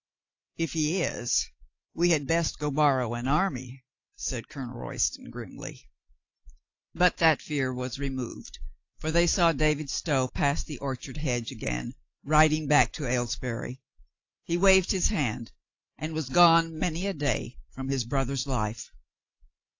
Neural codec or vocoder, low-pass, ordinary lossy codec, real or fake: none; 7.2 kHz; AAC, 48 kbps; real